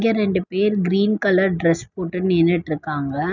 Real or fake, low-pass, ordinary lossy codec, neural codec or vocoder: real; 7.2 kHz; none; none